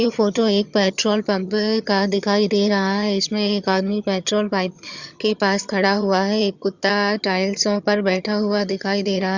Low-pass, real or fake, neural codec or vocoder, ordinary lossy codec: 7.2 kHz; fake; vocoder, 22.05 kHz, 80 mel bands, HiFi-GAN; Opus, 64 kbps